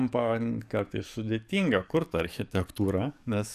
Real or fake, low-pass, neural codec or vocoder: fake; 14.4 kHz; codec, 44.1 kHz, 7.8 kbps, DAC